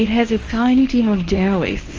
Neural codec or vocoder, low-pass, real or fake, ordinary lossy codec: codec, 24 kHz, 0.9 kbps, WavTokenizer, medium speech release version 1; 7.2 kHz; fake; Opus, 24 kbps